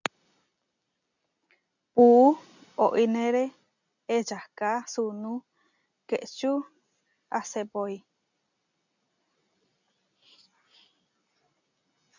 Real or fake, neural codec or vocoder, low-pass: real; none; 7.2 kHz